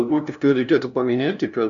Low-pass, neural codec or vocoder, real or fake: 7.2 kHz; codec, 16 kHz, 0.5 kbps, FunCodec, trained on LibriTTS, 25 frames a second; fake